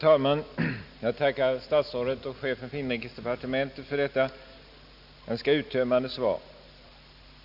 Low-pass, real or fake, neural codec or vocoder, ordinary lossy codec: 5.4 kHz; real; none; none